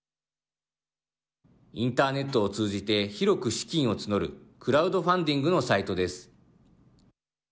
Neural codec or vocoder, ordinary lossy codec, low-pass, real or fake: none; none; none; real